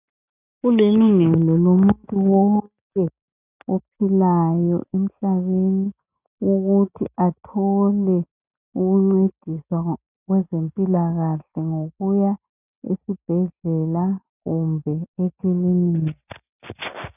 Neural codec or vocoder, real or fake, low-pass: none; real; 3.6 kHz